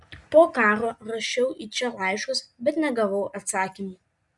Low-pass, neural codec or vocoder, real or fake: 10.8 kHz; none; real